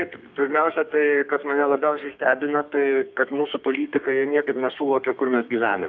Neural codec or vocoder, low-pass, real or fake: codec, 32 kHz, 1.9 kbps, SNAC; 7.2 kHz; fake